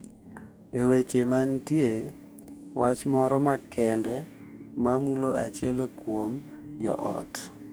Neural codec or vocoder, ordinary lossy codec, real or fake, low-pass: codec, 44.1 kHz, 2.6 kbps, DAC; none; fake; none